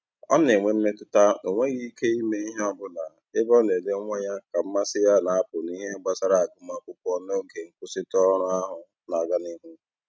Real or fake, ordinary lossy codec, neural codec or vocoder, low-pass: real; none; none; none